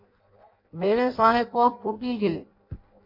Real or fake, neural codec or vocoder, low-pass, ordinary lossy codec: fake; codec, 16 kHz in and 24 kHz out, 0.6 kbps, FireRedTTS-2 codec; 5.4 kHz; MP3, 32 kbps